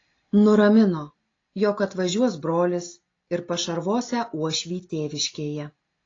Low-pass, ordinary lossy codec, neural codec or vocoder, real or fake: 7.2 kHz; AAC, 32 kbps; none; real